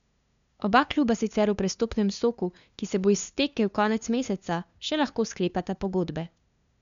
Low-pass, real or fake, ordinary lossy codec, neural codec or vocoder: 7.2 kHz; fake; none; codec, 16 kHz, 2 kbps, FunCodec, trained on LibriTTS, 25 frames a second